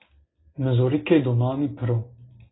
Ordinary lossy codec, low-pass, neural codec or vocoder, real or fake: AAC, 16 kbps; 7.2 kHz; none; real